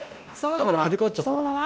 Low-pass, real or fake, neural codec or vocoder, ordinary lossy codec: none; fake; codec, 16 kHz, 1 kbps, X-Codec, WavLM features, trained on Multilingual LibriSpeech; none